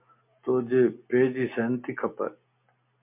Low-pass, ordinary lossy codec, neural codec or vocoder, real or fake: 3.6 kHz; MP3, 32 kbps; none; real